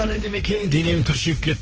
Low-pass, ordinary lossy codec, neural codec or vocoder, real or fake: 7.2 kHz; Opus, 16 kbps; codec, 16 kHz, 1.1 kbps, Voila-Tokenizer; fake